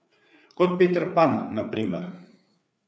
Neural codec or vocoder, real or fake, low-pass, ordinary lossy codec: codec, 16 kHz, 4 kbps, FreqCodec, larger model; fake; none; none